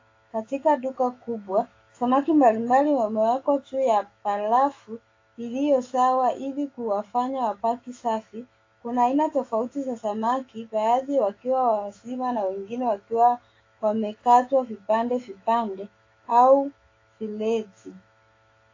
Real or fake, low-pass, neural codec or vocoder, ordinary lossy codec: fake; 7.2 kHz; autoencoder, 48 kHz, 128 numbers a frame, DAC-VAE, trained on Japanese speech; AAC, 32 kbps